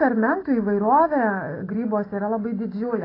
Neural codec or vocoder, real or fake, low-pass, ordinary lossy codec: none; real; 5.4 kHz; AAC, 24 kbps